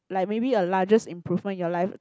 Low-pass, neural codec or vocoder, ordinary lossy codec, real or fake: none; none; none; real